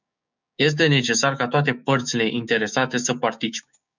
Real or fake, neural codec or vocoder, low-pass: fake; codec, 16 kHz, 6 kbps, DAC; 7.2 kHz